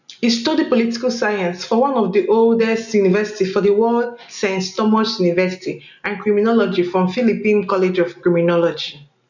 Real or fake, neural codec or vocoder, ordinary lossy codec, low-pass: real; none; none; 7.2 kHz